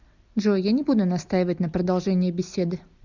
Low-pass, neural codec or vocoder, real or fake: 7.2 kHz; none; real